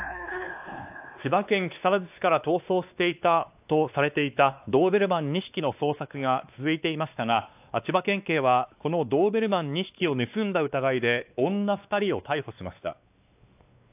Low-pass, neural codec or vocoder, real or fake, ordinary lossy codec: 3.6 kHz; codec, 16 kHz, 2 kbps, X-Codec, WavLM features, trained on Multilingual LibriSpeech; fake; AAC, 32 kbps